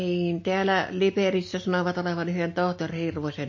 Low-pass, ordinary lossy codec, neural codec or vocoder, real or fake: 7.2 kHz; MP3, 32 kbps; none; real